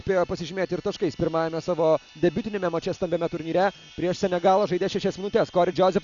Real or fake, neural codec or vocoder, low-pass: real; none; 7.2 kHz